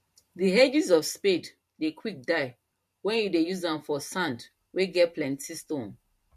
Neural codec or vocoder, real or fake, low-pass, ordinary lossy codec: vocoder, 44.1 kHz, 128 mel bands every 512 samples, BigVGAN v2; fake; 14.4 kHz; MP3, 64 kbps